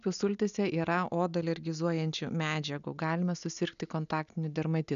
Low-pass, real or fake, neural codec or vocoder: 7.2 kHz; real; none